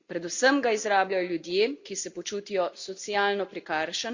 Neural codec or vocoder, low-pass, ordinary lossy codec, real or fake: none; 7.2 kHz; Opus, 64 kbps; real